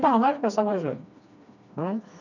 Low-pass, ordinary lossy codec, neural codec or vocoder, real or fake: 7.2 kHz; none; codec, 16 kHz, 2 kbps, FreqCodec, smaller model; fake